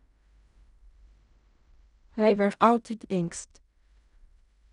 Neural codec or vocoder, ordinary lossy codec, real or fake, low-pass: codec, 16 kHz in and 24 kHz out, 0.4 kbps, LongCat-Audio-Codec, fine tuned four codebook decoder; none; fake; 10.8 kHz